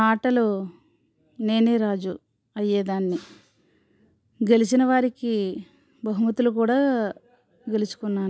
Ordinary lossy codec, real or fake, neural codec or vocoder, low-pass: none; real; none; none